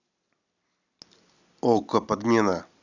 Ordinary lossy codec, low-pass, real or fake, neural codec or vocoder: none; 7.2 kHz; real; none